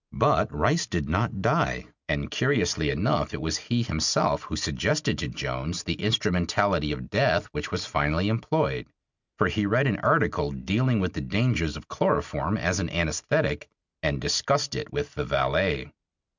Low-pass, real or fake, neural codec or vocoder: 7.2 kHz; real; none